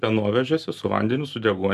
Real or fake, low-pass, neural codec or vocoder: real; 14.4 kHz; none